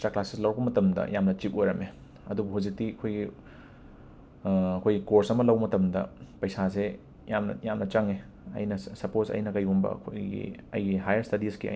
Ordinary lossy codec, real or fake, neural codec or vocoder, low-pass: none; real; none; none